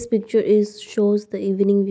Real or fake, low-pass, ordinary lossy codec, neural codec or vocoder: real; none; none; none